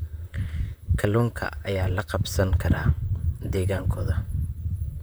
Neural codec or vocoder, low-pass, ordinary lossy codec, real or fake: vocoder, 44.1 kHz, 128 mel bands, Pupu-Vocoder; none; none; fake